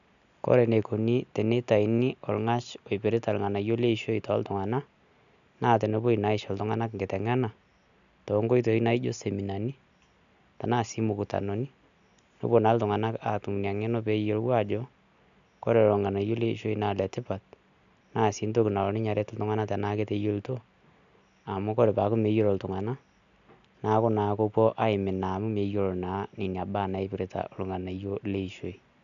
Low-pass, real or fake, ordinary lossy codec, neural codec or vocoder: 7.2 kHz; real; none; none